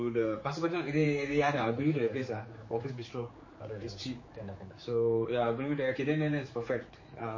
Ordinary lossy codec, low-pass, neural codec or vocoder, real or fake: MP3, 32 kbps; 7.2 kHz; codec, 16 kHz, 4 kbps, X-Codec, HuBERT features, trained on general audio; fake